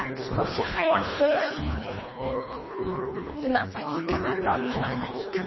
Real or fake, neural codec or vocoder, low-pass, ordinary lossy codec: fake; codec, 24 kHz, 1.5 kbps, HILCodec; 7.2 kHz; MP3, 24 kbps